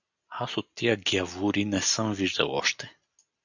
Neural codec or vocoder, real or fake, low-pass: none; real; 7.2 kHz